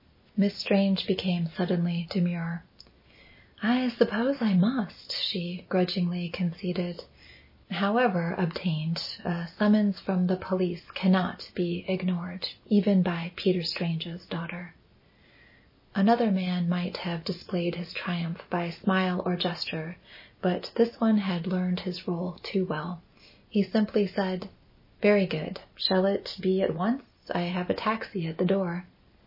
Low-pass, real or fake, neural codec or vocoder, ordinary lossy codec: 5.4 kHz; real; none; MP3, 24 kbps